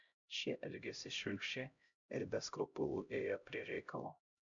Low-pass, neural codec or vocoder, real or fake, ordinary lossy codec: 7.2 kHz; codec, 16 kHz, 0.5 kbps, X-Codec, HuBERT features, trained on LibriSpeech; fake; MP3, 64 kbps